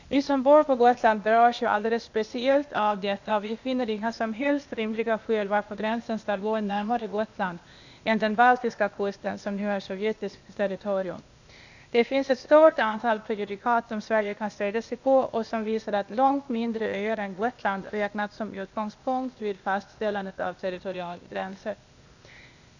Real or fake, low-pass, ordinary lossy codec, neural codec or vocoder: fake; 7.2 kHz; none; codec, 16 kHz, 0.8 kbps, ZipCodec